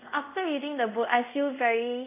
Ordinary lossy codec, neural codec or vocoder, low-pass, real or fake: none; codec, 24 kHz, 0.5 kbps, DualCodec; 3.6 kHz; fake